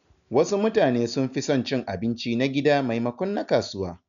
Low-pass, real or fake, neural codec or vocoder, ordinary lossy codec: 7.2 kHz; real; none; none